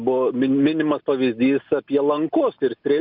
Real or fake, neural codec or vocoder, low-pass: real; none; 5.4 kHz